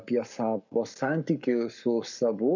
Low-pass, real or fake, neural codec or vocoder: 7.2 kHz; fake; codec, 44.1 kHz, 7.8 kbps, Pupu-Codec